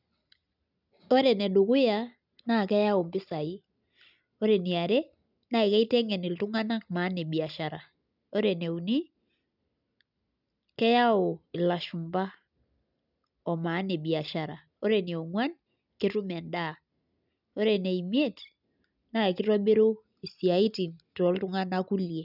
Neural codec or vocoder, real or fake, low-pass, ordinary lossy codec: none; real; 5.4 kHz; none